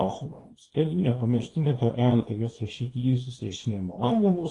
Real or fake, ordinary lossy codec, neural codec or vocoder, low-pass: fake; AAC, 32 kbps; codec, 24 kHz, 0.9 kbps, WavTokenizer, small release; 10.8 kHz